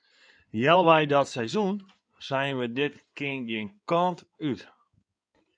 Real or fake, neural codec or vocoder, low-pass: fake; codec, 16 kHz in and 24 kHz out, 2.2 kbps, FireRedTTS-2 codec; 9.9 kHz